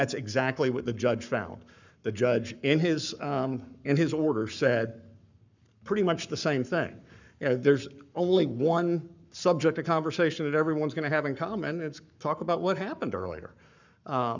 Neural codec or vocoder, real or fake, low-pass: codec, 44.1 kHz, 7.8 kbps, Pupu-Codec; fake; 7.2 kHz